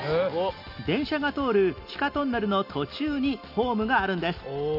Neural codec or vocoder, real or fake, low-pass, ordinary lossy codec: none; real; 5.4 kHz; none